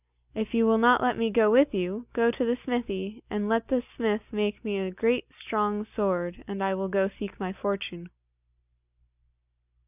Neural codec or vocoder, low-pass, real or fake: none; 3.6 kHz; real